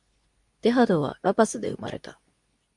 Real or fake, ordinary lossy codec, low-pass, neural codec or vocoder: fake; MP3, 96 kbps; 10.8 kHz; codec, 24 kHz, 0.9 kbps, WavTokenizer, medium speech release version 2